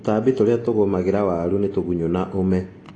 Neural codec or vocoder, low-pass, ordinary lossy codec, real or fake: none; 9.9 kHz; AAC, 32 kbps; real